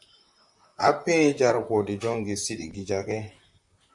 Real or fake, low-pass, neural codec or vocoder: fake; 10.8 kHz; vocoder, 44.1 kHz, 128 mel bands, Pupu-Vocoder